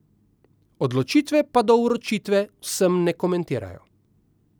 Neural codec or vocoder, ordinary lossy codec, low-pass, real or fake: none; none; none; real